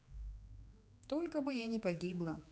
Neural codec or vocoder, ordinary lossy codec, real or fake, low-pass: codec, 16 kHz, 2 kbps, X-Codec, HuBERT features, trained on general audio; none; fake; none